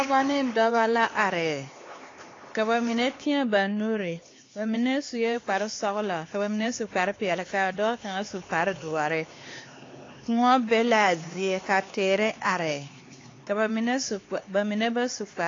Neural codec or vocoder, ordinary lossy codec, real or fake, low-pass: codec, 16 kHz, 4 kbps, X-Codec, HuBERT features, trained on LibriSpeech; AAC, 32 kbps; fake; 7.2 kHz